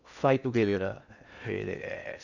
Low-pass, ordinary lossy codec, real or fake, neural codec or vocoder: 7.2 kHz; none; fake; codec, 16 kHz in and 24 kHz out, 0.6 kbps, FocalCodec, streaming, 2048 codes